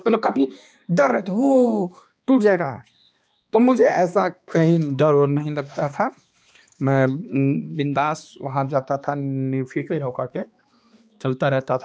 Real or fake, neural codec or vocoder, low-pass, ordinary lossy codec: fake; codec, 16 kHz, 2 kbps, X-Codec, HuBERT features, trained on balanced general audio; none; none